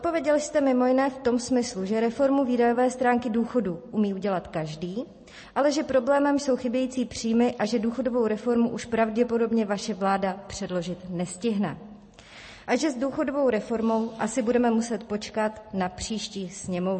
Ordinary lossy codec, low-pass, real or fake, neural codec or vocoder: MP3, 32 kbps; 10.8 kHz; real; none